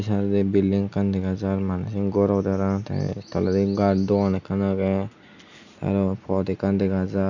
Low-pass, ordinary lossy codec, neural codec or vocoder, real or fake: 7.2 kHz; none; none; real